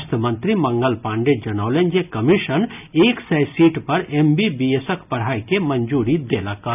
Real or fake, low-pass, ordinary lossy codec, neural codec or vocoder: real; 3.6 kHz; none; none